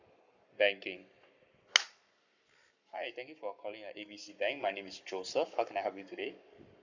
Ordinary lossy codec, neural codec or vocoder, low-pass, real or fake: none; codec, 44.1 kHz, 7.8 kbps, Pupu-Codec; 7.2 kHz; fake